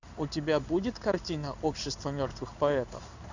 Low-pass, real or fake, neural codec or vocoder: 7.2 kHz; fake; codec, 16 kHz in and 24 kHz out, 1 kbps, XY-Tokenizer